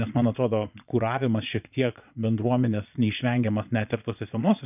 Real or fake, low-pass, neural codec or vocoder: fake; 3.6 kHz; vocoder, 22.05 kHz, 80 mel bands, Vocos